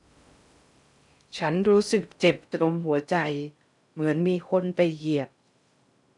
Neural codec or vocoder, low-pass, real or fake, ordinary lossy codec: codec, 16 kHz in and 24 kHz out, 0.6 kbps, FocalCodec, streaming, 4096 codes; 10.8 kHz; fake; none